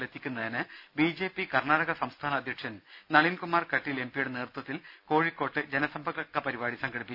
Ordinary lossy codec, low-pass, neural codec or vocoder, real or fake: none; 5.4 kHz; none; real